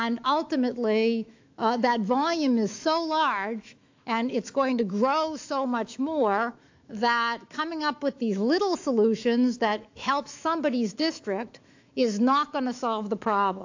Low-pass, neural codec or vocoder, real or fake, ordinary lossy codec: 7.2 kHz; autoencoder, 48 kHz, 128 numbers a frame, DAC-VAE, trained on Japanese speech; fake; AAC, 48 kbps